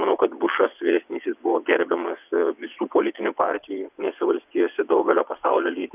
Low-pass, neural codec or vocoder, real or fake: 3.6 kHz; vocoder, 22.05 kHz, 80 mel bands, WaveNeXt; fake